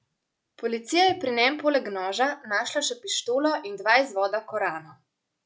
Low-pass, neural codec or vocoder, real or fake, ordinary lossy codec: none; none; real; none